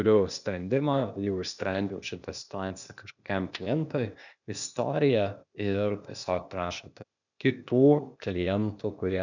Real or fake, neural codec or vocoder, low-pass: fake; codec, 16 kHz, 0.8 kbps, ZipCodec; 7.2 kHz